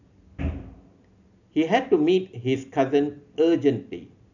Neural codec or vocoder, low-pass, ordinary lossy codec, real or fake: none; 7.2 kHz; none; real